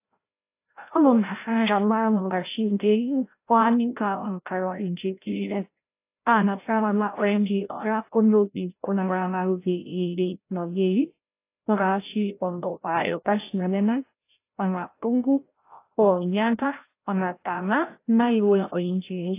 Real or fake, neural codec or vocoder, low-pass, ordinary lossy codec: fake; codec, 16 kHz, 0.5 kbps, FreqCodec, larger model; 3.6 kHz; AAC, 24 kbps